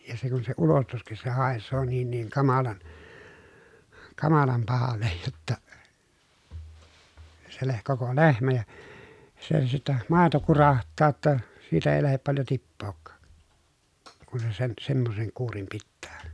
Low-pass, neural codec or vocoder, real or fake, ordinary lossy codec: none; none; real; none